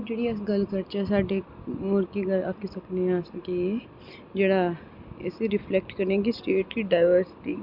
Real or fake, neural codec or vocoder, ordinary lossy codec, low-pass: real; none; Opus, 64 kbps; 5.4 kHz